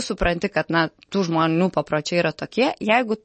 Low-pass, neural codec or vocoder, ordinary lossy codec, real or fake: 10.8 kHz; none; MP3, 32 kbps; real